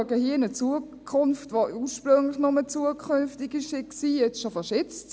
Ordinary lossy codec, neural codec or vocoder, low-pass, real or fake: none; none; none; real